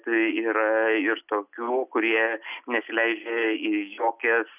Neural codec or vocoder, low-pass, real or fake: none; 3.6 kHz; real